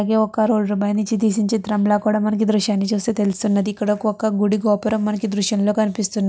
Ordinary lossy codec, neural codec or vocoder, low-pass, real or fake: none; none; none; real